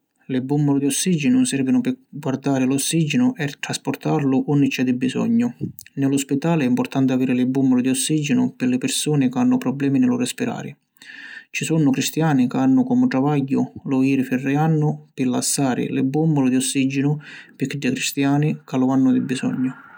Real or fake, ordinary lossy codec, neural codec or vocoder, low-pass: real; none; none; none